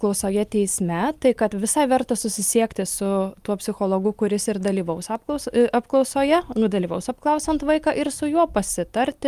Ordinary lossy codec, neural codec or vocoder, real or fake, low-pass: Opus, 32 kbps; none; real; 14.4 kHz